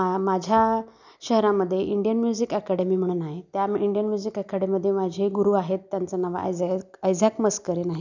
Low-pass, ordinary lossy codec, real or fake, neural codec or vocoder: 7.2 kHz; none; real; none